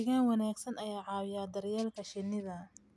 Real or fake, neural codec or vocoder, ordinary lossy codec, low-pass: real; none; none; none